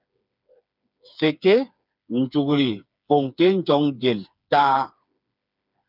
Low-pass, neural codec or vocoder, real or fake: 5.4 kHz; codec, 16 kHz, 4 kbps, FreqCodec, smaller model; fake